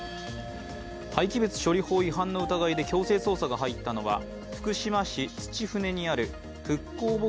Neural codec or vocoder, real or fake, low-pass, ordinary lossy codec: none; real; none; none